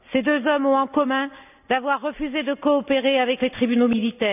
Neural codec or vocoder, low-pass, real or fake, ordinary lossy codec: none; 3.6 kHz; real; none